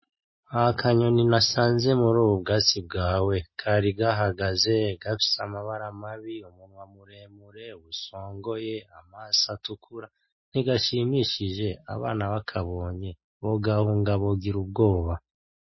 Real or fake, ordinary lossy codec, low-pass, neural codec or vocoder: real; MP3, 24 kbps; 7.2 kHz; none